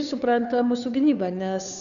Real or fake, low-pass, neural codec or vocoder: fake; 7.2 kHz; codec, 16 kHz, 2 kbps, FunCodec, trained on Chinese and English, 25 frames a second